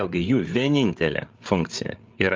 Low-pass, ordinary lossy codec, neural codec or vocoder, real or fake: 7.2 kHz; Opus, 24 kbps; codec, 16 kHz, 8 kbps, FreqCodec, larger model; fake